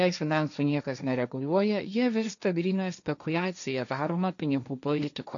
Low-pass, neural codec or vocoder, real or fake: 7.2 kHz; codec, 16 kHz, 1.1 kbps, Voila-Tokenizer; fake